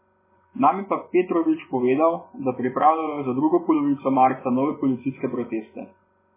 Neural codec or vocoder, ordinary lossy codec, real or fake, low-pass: vocoder, 24 kHz, 100 mel bands, Vocos; MP3, 16 kbps; fake; 3.6 kHz